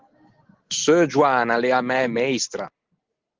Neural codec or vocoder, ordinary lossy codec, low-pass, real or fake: none; Opus, 16 kbps; 7.2 kHz; real